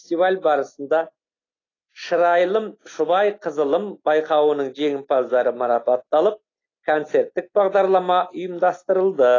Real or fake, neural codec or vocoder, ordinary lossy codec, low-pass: real; none; AAC, 32 kbps; 7.2 kHz